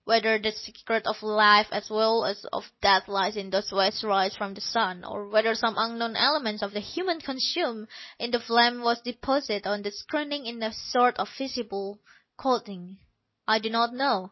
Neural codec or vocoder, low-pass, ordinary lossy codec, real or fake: none; 7.2 kHz; MP3, 24 kbps; real